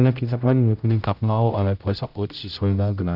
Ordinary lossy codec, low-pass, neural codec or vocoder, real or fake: none; 5.4 kHz; codec, 16 kHz, 0.5 kbps, X-Codec, HuBERT features, trained on general audio; fake